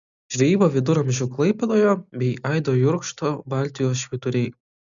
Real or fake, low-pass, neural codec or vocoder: real; 7.2 kHz; none